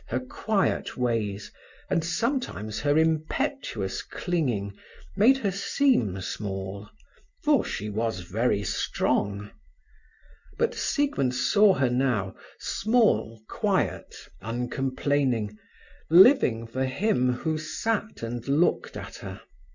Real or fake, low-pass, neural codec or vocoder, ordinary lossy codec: real; 7.2 kHz; none; Opus, 64 kbps